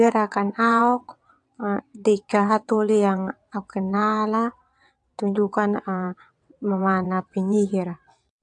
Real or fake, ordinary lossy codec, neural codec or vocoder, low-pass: fake; AAC, 64 kbps; vocoder, 22.05 kHz, 80 mel bands, WaveNeXt; 9.9 kHz